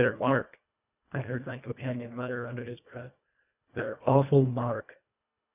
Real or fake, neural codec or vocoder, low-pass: fake; codec, 24 kHz, 1.5 kbps, HILCodec; 3.6 kHz